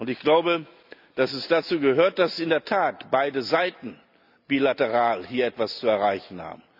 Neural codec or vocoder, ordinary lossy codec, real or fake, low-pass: none; none; real; 5.4 kHz